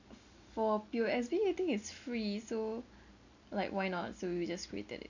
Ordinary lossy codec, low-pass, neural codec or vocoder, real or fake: none; 7.2 kHz; none; real